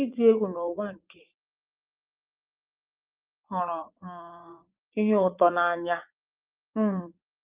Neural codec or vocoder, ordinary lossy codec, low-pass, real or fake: vocoder, 44.1 kHz, 80 mel bands, Vocos; Opus, 32 kbps; 3.6 kHz; fake